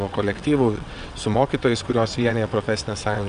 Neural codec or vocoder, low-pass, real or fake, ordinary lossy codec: vocoder, 22.05 kHz, 80 mel bands, WaveNeXt; 9.9 kHz; fake; AAC, 96 kbps